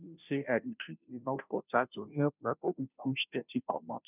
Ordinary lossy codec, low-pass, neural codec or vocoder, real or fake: none; 3.6 kHz; codec, 16 kHz, 0.5 kbps, FunCodec, trained on Chinese and English, 25 frames a second; fake